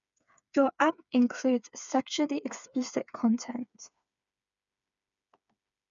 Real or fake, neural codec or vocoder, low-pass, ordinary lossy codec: fake; codec, 16 kHz, 4 kbps, FreqCodec, smaller model; 7.2 kHz; none